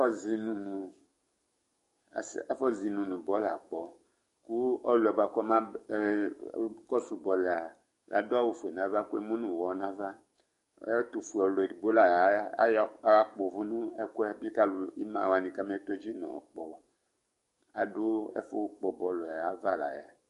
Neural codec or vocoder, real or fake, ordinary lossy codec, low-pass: codec, 44.1 kHz, 7.8 kbps, DAC; fake; MP3, 48 kbps; 14.4 kHz